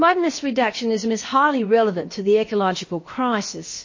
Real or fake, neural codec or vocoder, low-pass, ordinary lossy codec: fake; codec, 16 kHz, about 1 kbps, DyCAST, with the encoder's durations; 7.2 kHz; MP3, 32 kbps